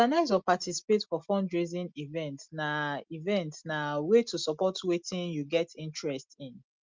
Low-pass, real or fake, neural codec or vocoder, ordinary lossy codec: 7.2 kHz; real; none; Opus, 32 kbps